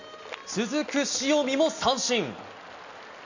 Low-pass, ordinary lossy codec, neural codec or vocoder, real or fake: 7.2 kHz; none; none; real